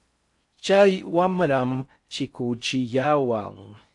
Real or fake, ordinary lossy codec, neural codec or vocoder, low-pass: fake; AAC, 64 kbps; codec, 16 kHz in and 24 kHz out, 0.6 kbps, FocalCodec, streaming, 4096 codes; 10.8 kHz